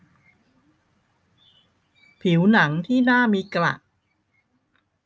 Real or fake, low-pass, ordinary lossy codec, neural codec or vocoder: real; none; none; none